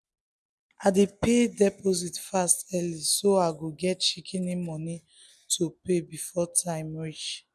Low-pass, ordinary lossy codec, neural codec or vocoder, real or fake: none; none; none; real